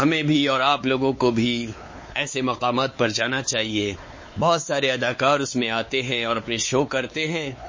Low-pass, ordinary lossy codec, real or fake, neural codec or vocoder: 7.2 kHz; MP3, 32 kbps; fake; codec, 16 kHz, 4 kbps, X-Codec, WavLM features, trained on Multilingual LibriSpeech